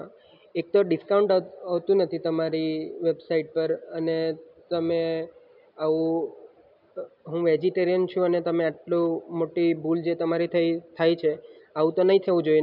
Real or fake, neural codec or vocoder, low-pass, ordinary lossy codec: real; none; 5.4 kHz; none